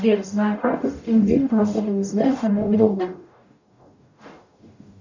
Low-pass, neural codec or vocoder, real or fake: 7.2 kHz; codec, 44.1 kHz, 0.9 kbps, DAC; fake